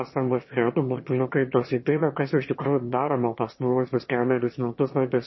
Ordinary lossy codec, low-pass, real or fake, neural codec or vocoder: MP3, 24 kbps; 7.2 kHz; fake; autoencoder, 22.05 kHz, a latent of 192 numbers a frame, VITS, trained on one speaker